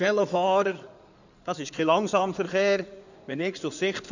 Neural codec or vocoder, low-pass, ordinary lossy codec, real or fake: codec, 16 kHz in and 24 kHz out, 2.2 kbps, FireRedTTS-2 codec; 7.2 kHz; none; fake